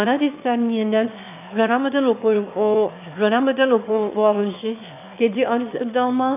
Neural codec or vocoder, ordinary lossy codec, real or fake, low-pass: autoencoder, 22.05 kHz, a latent of 192 numbers a frame, VITS, trained on one speaker; none; fake; 3.6 kHz